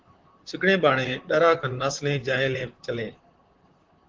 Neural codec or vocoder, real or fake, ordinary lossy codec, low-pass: vocoder, 44.1 kHz, 128 mel bands, Pupu-Vocoder; fake; Opus, 16 kbps; 7.2 kHz